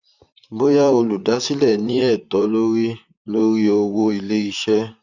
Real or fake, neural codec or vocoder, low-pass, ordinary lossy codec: fake; vocoder, 44.1 kHz, 128 mel bands, Pupu-Vocoder; 7.2 kHz; none